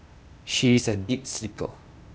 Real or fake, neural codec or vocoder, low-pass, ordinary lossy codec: fake; codec, 16 kHz, 0.8 kbps, ZipCodec; none; none